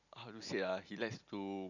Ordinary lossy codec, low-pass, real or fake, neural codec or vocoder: none; 7.2 kHz; real; none